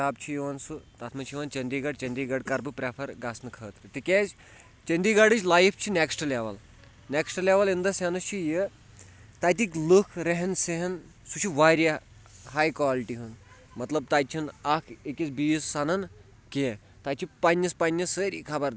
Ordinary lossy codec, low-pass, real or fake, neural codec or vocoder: none; none; real; none